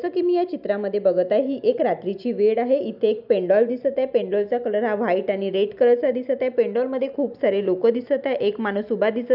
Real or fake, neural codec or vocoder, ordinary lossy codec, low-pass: real; none; none; 5.4 kHz